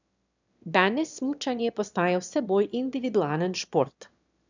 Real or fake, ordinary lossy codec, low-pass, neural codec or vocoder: fake; none; 7.2 kHz; autoencoder, 22.05 kHz, a latent of 192 numbers a frame, VITS, trained on one speaker